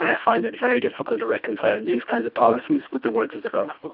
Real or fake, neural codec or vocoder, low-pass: fake; codec, 24 kHz, 1.5 kbps, HILCodec; 5.4 kHz